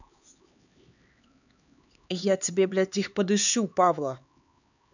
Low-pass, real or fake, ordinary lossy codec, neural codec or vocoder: 7.2 kHz; fake; none; codec, 16 kHz, 4 kbps, X-Codec, HuBERT features, trained on LibriSpeech